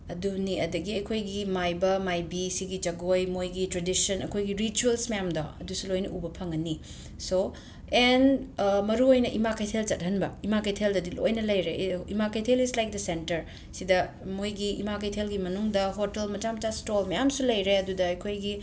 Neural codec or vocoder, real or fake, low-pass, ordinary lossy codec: none; real; none; none